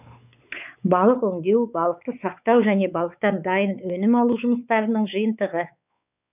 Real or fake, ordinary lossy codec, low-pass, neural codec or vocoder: fake; none; 3.6 kHz; codec, 16 kHz, 4 kbps, X-Codec, WavLM features, trained on Multilingual LibriSpeech